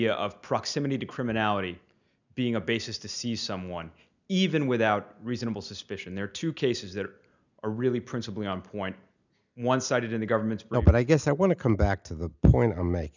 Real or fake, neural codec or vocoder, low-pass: real; none; 7.2 kHz